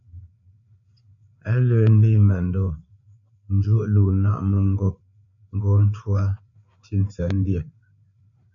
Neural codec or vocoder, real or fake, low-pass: codec, 16 kHz, 4 kbps, FreqCodec, larger model; fake; 7.2 kHz